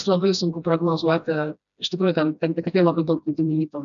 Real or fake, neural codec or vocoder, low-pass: fake; codec, 16 kHz, 1 kbps, FreqCodec, smaller model; 7.2 kHz